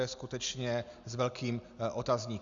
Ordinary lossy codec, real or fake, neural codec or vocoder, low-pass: Opus, 64 kbps; real; none; 7.2 kHz